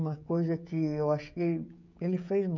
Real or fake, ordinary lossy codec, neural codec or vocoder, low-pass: fake; none; codec, 16 kHz, 16 kbps, FreqCodec, smaller model; 7.2 kHz